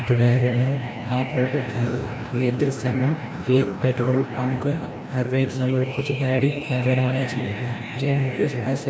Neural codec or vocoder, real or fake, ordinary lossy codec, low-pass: codec, 16 kHz, 1 kbps, FreqCodec, larger model; fake; none; none